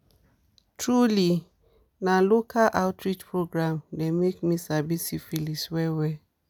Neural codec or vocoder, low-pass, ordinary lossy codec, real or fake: none; none; none; real